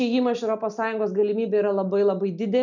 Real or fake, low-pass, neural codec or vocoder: real; 7.2 kHz; none